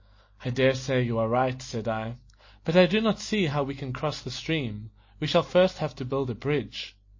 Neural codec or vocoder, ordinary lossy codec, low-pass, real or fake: none; MP3, 32 kbps; 7.2 kHz; real